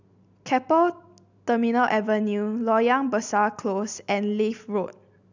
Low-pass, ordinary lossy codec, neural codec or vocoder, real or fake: 7.2 kHz; none; none; real